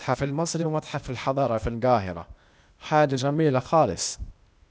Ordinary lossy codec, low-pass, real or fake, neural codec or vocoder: none; none; fake; codec, 16 kHz, 0.8 kbps, ZipCodec